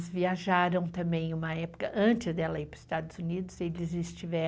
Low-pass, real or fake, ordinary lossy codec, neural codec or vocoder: none; real; none; none